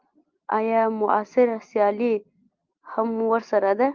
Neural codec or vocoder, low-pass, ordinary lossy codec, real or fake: none; 7.2 kHz; Opus, 32 kbps; real